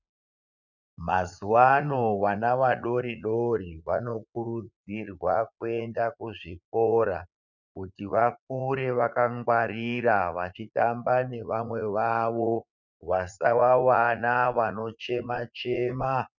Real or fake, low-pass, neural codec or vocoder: fake; 7.2 kHz; vocoder, 44.1 kHz, 80 mel bands, Vocos